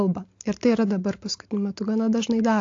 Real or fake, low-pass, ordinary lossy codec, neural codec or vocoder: real; 7.2 kHz; AAC, 48 kbps; none